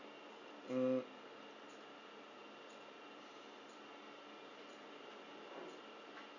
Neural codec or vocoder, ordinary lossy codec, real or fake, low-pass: none; none; real; 7.2 kHz